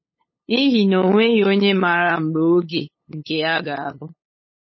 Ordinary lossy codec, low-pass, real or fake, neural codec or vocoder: MP3, 24 kbps; 7.2 kHz; fake; codec, 16 kHz, 8 kbps, FunCodec, trained on LibriTTS, 25 frames a second